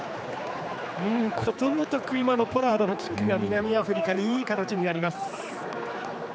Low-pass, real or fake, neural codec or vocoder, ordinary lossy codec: none; fake; codec, 16 kHz, 4 kbps, X-Codec, HuBERT features, trained on general audio; none